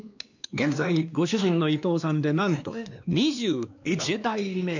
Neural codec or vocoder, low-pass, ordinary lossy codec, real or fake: codec, 16 kHz, 2 kbps, X-Codec, WavLM features, trained on Multilingual LibriSpeech; 7.2 kHz; none; fake